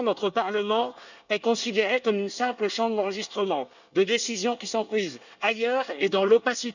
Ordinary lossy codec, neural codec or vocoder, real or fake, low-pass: none; codec, 24 kHz, 1 kbps, SNAC; fake; 7.2 kHz